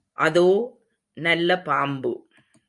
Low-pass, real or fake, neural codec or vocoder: 10.8 kHz; fake; vocoder, 44.1 kHz, 128 mel bands every 512 samples, BigVGAN v2